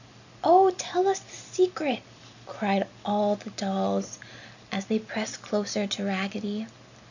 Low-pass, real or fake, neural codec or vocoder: 7.2 kHz; real; none